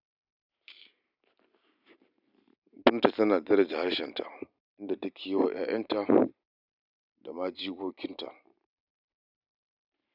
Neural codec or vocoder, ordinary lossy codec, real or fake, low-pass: vocoder, 44.1 kHz, 128 mel bands every 512 samples, BigVGAN v2; none; fake; 5.4 kHz